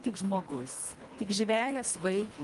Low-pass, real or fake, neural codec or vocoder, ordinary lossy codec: 10.8 kHz; fake; codec, 24 kHz, 1.5 kbps, HILCodec; Opus, 32 kbps